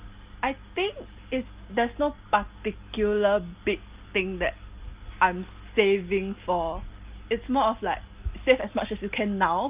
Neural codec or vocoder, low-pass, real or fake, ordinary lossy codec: none; 3.6 kHz; real; Opus, 24 kbps